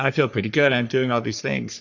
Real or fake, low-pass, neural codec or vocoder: fake; 7.2 kHz; codec, 44.1 kHz, 3.4 kbps, Pupu-Codec